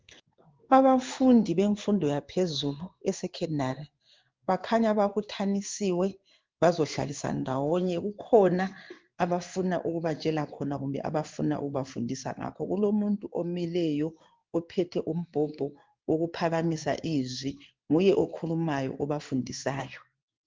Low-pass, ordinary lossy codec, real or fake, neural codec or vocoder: 7.2 kHz; Opus, 32 kbps; fake; codec, 16 kHz in and 24 kHz out, 1 kbps, XY-Tokenizer